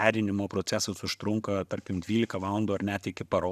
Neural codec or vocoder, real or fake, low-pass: none; real; 14.4 kHz